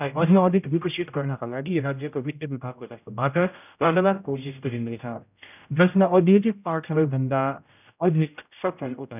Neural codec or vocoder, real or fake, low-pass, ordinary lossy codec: codec, 16 kHz, 0.5 kbps, X-Codec, HuBERT features, trained on general audio; fake; 3.6 kHz; none